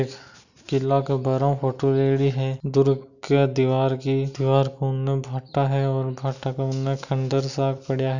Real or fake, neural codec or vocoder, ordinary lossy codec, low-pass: real; none; none; 7.2 kHz